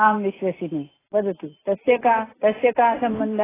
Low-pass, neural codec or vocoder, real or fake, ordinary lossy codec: 3.6 kHz; none; real; AAC, 16 kbps